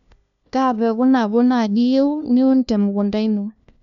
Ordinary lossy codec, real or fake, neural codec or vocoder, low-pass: none; fake; codec, 16 kHz, 1 kbps, FunCodec, trained on LibriTTS, 50 frames a second; 7.2 kHz